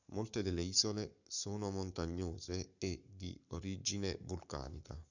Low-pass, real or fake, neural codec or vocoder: 7.2 kHz; fake; codec, 44.1 kHz, 7.8 kbps, Pupu-Codec